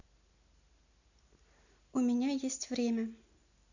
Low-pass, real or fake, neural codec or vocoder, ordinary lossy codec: 7.2 kHz; real; none; none